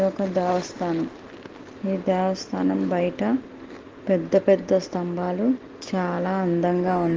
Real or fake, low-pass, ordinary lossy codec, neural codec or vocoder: real; 7.2 kHz; Opus, 16 kbps; none